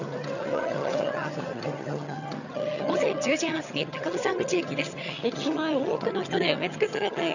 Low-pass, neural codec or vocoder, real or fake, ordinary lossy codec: 7.2 kHz; vocoder, 22.05 kHz, 80 mel bands, HiFi-GAN; fake; none